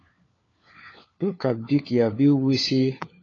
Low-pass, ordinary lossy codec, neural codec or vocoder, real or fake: 7.2 kHz; AAC, 32 kbps; codec, 16 kHz, 4 kbps, FunCodec, trained on LibriTTS, 50 frames a second; fake